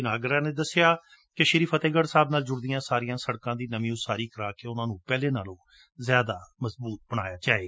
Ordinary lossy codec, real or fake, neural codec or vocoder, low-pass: none; real; none; none